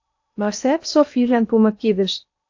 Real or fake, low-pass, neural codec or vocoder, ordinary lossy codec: fake; 7.2 kHz; codec, 16 kHz in and 24 kHz out, 0.8 kbps, FocalCodec, streaming, 65536 codes; AAC, 48 kbps